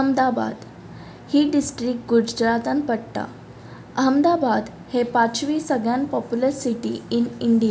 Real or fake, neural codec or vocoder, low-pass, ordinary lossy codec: real; none; none; none